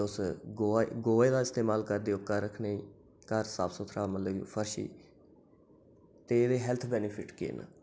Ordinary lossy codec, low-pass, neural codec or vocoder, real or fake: none; none; none; real